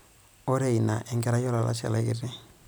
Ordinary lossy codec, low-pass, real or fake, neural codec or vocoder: none; none; real; none